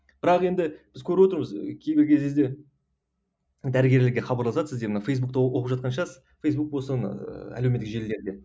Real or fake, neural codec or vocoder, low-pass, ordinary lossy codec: real; none; none; none